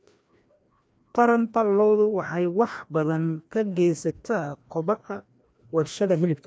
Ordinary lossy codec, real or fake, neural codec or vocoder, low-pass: none; fake; codec, 16 kHz, 1 kbps, FreqCodec, larger model; none